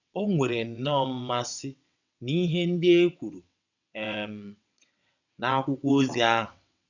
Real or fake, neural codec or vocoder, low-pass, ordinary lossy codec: fake; vocoder, 22.05 kHz, 80 mel bands, WaveNeXt; 7.2 kHz; none